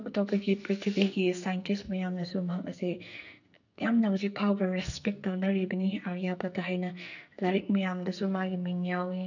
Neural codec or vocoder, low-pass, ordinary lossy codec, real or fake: codec, 44.1 kHz, 2.6 kbps, SNAC; 7.2 kHz; none; fake